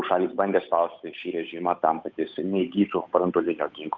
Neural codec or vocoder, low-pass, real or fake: codec, 16 kHz, 8 kbps, FunCodec, trained on Chinese and English, 25 frames a second; 7.2 kHz; fake